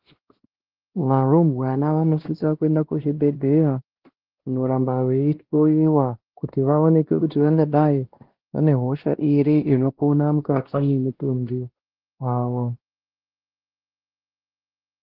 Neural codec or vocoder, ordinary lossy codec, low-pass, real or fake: codec, 16 kHz, 1 kbps, X-Codec, WavLM features, trained on Multilingual LibriSpeech; Opus, 16 kbps; 5.4 kHz; fake